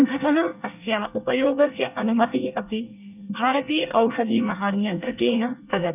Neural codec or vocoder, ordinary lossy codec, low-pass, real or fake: codec, 24 kHz, 1 kbps, SNAC; none; 3.6 kHz; fake